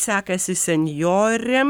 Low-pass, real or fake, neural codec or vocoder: 19.8 kHz; fake; codec, 44.1 kHz, 7.8 kbps, Pupu-Codec